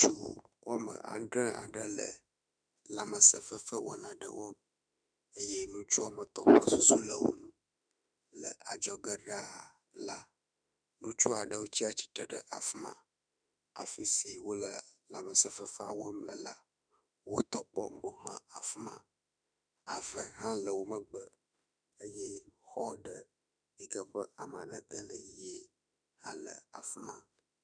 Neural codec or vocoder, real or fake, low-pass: autoencoder, 48 kHz, 32 numbers a frame, DAC-VAE, trained on Japanese speech; fake; 9.9 kHz